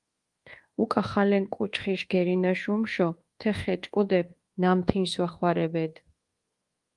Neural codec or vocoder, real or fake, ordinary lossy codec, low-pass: codec, 24 kHz, 1.2 kbps, DualCodec; fake; Opus, 24 kbps; 10.8 kHz